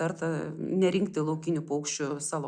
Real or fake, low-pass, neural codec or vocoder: real; 9.9 kHz; none